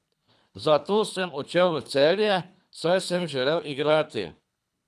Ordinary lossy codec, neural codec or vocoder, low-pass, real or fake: none; codec, 24 kHz, 3 kbps, HILCodec; none; fake